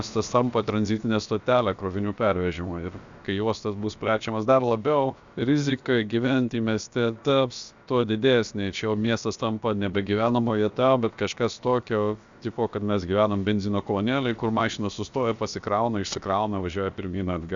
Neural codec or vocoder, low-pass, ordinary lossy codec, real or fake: codec, 16 kHz, about 1 kbps, DyCAST, with the encoder's durations; 7.2 kHz; Opus, 64 kbps; fake